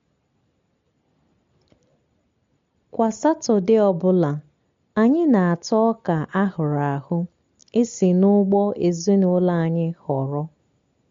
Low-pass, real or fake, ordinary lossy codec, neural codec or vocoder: 7.2 kHz; real; MP3, 48 kbps; none